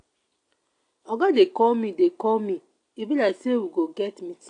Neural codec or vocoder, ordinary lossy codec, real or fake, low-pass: none; AAC, 48 kbps; real; 9.9 kHz